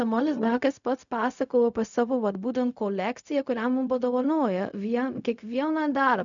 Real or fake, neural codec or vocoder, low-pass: fake; codec, 16 kHz, 0.4 kbps, LongCat-Audio-Codec; 7.2 kHz